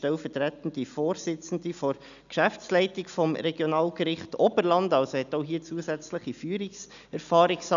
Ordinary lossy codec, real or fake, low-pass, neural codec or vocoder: none; real; 7.2 kHz; none